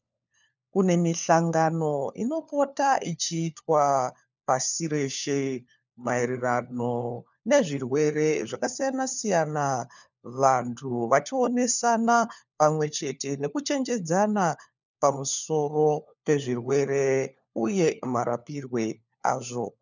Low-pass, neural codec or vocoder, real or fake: 7.2 kHz; codec, 16 kHz, 4 kbps, FunCodec, trained on LibriTTS, 50 frames a second; fake